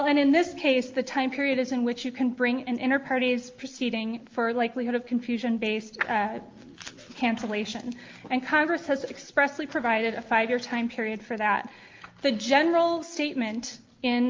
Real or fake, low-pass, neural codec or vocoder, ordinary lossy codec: real; 7.2 kHz; none; Opus, 32 kbps